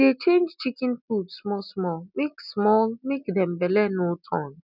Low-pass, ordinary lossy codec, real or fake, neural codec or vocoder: 5.4 kHz; none; real; none